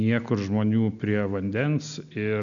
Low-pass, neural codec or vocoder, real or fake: 7.2 kHz; none; real